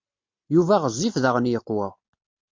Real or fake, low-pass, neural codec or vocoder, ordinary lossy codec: real; 7.2 kHz; none; MP3, 48 kbps